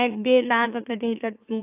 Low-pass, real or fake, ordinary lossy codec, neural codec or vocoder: 3.6 kHz; fake; AAC, 32 kbps; autoencoder, 44.1 kHz, a latent of 192 numbers a frame, MeloTTS